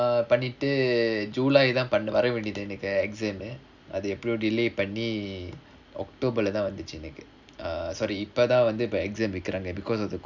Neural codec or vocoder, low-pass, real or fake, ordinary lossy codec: none; 7.2 kHz; real; none